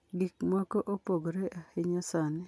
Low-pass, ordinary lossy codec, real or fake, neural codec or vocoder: none; none; real; none